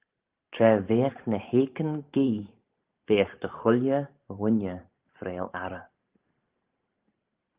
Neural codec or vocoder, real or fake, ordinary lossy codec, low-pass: vocoder, 44.1 kHz, 128 mel bands every 512 samples, BigVGAN v2; fake; Opus, 32 kbps; 3.6 kHz